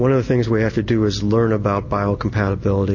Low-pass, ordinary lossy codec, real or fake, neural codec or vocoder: 7.2 kHz; MP3, 32 kbps; real; none